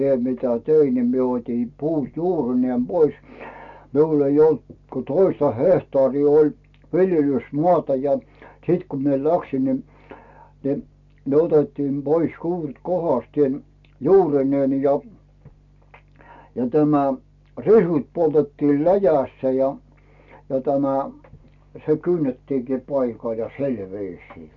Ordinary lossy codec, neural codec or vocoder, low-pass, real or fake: none; none; 7.2 kHz; real